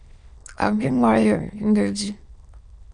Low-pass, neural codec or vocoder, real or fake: 9.9 kHz; autoencoder, 22.05 kHz, a latent of 192 numbers a frame, VITS, trained on many speakers; fake